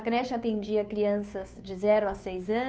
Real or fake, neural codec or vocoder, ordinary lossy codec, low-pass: fake; codec, 16 kHz, 2 kbps, FunCodec, trained on Chinese and English, 25 frames a second; none; none